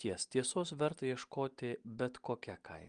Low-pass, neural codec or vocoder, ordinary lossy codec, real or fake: 9.9 kHz; none; Opus, 32 kbps; real